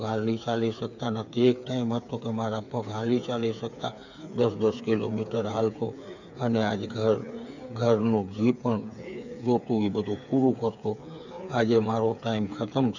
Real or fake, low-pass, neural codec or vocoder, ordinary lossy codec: fake; 7.2 kHz; codec, 16 kHz, 8 kbps, FreqCodec, smaller model; none